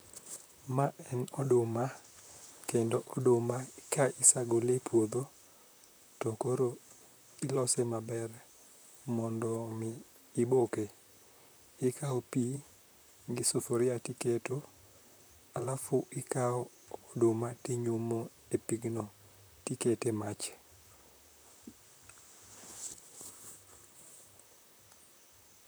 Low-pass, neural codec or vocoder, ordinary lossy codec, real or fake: none; vocoder, 44.1 kHz, 128 mel bands, Pupu-Vocoder; none; fake